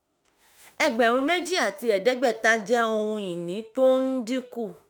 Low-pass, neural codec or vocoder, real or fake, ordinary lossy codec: none; autoencoder, 48 kHz, 32 numbers a frame, DAC-VAE, trained on Japanese speech; fake; none